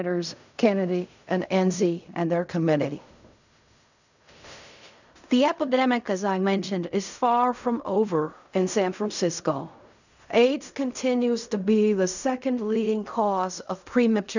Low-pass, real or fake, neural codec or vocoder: 7.2 kHz; fake; codec, 16 kHz in and 24 kHz out, 0.4 kbps, LongCat-Audio-Codec, fine tuned four codebook decoder